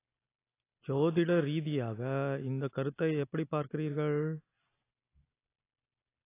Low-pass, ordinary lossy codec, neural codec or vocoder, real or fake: 3.6 kHz; AAC, 24 kbps; none; real